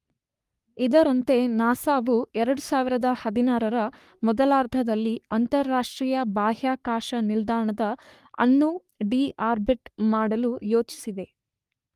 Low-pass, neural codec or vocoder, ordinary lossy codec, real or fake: 14.4 kHz; codec, 44.1 kHz, 3.4 kbps, Pupu-Codec; Opus, 32 kbps; fake